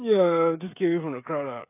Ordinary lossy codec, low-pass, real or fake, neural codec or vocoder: AAC, 32 kbps; 3.6 kHz; fake; codec, 16 kHz, 16 kbps, FreqCodec, smaller model